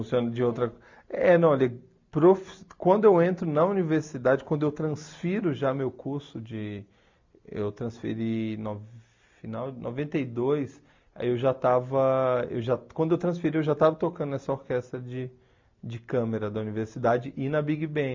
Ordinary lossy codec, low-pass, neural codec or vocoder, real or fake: MP3, 64 kbps; 7.2 kHz; none; real